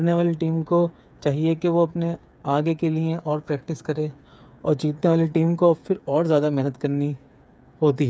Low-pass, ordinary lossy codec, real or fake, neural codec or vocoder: none; none; fake; codec, 16 kHz, 8 kbps, FreqCodec, smaller model